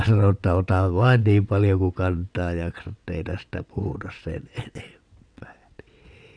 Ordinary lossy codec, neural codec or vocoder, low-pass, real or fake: none; vocoder, 22.05 kHz, 80 mel bands, WaveNeXt; 9.9 kHz; fake